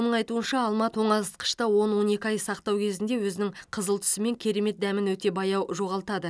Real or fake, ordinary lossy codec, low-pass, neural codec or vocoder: real; none; none; none